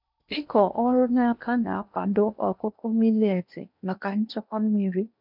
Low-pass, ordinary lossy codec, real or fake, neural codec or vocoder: 5.4 kHz; none; fake; codec, 16 kHz in and 24 kHz out, 0.8 kbps, FocalCodec, streaming, 65536 codes